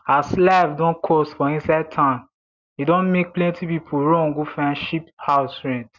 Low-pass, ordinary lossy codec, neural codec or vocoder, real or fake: 7.2 kHz; Opus, 64 kbps; none; real